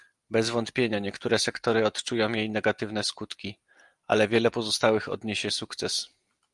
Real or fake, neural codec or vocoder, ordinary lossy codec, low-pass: real; none; Opus, 32 kbps; 10.8 kHz